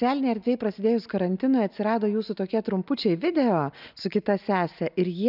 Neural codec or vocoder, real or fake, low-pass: none; real; 5.4 kHz